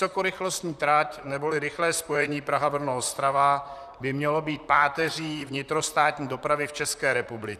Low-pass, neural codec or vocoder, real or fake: 14.4 kHz; vocoder, 44.1 kHz, 128 mel bands, Pupu-Vocoder; fake